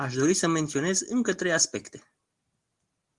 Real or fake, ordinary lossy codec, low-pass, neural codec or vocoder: real; Opus, 24 kbps; 10.8 kHz; none